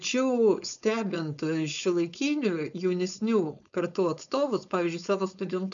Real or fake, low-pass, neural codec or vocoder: fake; 7.2 kHz; codec, 16 kHz, 4.8 kbps, FACodec